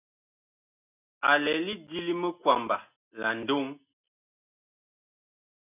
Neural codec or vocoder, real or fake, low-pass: none; real; 3.6 kHz